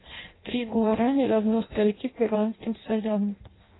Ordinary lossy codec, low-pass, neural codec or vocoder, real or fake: AAC, 16 kbps; 7.2 kHz; codec, 16 kHz in and 24 kHz out, 0.6 kbps, FireRedTTS-2 codec; fake